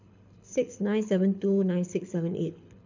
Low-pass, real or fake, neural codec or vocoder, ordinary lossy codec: 7.2 kHz; fake; codec, 24 kHz, 6 kbps, HILCodec; none